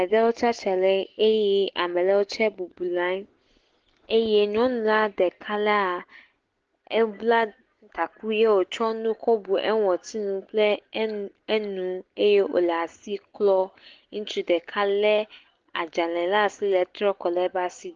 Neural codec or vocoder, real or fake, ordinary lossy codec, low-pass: none; real; Opus, 16 kbps; 7.2 kHz